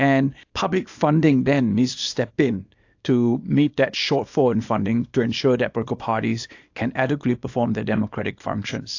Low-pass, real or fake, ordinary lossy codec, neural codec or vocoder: 7.2 kHz; fake; AAC, 48 kbps; codec, 24 kHz, 0.9 kbps, WavTokenizer, small release